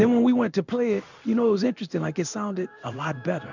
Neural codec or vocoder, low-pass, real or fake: codec, 16 kHz in and 24 kHz out, 1 kbps, XY-Tokenizer; 7.2 kHz; fake